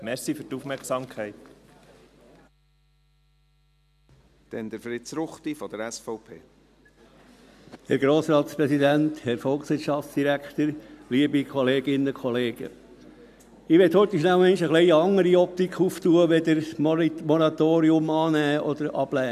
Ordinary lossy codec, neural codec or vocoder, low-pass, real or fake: none; none; 14.4 kHz; real